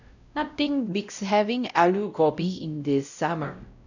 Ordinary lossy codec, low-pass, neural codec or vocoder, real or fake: none; 7.2 kHz; codec, 16 kHz, 0.5 kbps, X-Codec, WavLM features, trained on Multilingual LibriSpeech; fake